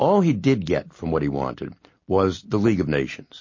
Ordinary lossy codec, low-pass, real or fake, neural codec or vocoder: MP3, 32 kbps; 7.2 kHz; real; none